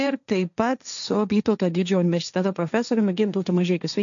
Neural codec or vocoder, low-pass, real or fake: codec, 16 kHz, 1.1 kbps, Voila-Tokenizer; 7.2 kHz; fake